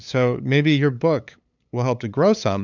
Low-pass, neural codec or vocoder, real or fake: 7.2 kHz; codec, 16 kHz, 8 kbps, FunCodec, trained on LibriTTS, 25 frames a second; fake